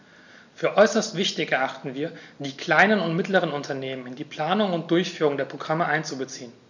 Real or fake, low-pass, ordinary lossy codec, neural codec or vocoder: real; 7.2 kHz; none; none